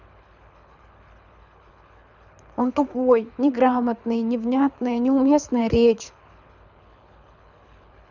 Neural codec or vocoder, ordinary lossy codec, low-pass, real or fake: codec, 24 kHz, 6 kbps, HILCodec; none; 7.2 kHz; fake